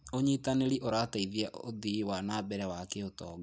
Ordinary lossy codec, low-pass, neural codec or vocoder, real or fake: none; none; none; real